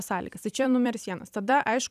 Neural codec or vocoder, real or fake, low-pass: vocoder, 44.1 kHz, 128 mel bands every 256 samples, BigVGAN v2; fake; 14.4 kHz